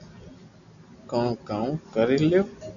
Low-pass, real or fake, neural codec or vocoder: 7.2 kHz; real; none